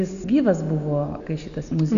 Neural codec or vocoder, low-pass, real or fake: none; 7.2 kHz; real